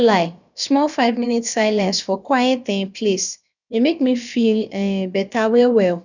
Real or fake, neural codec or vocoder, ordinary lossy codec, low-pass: fake; codec, 16 kHz, about 1 kbps, DyCAST, with the encoder's durations; none; 7.2 kHz